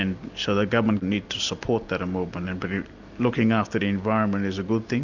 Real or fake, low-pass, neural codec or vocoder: real; 7.2 kHz; none